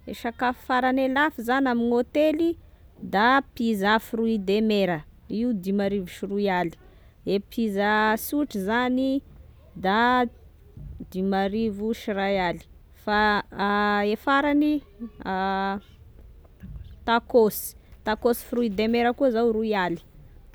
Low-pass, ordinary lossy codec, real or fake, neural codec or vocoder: none; none; real; none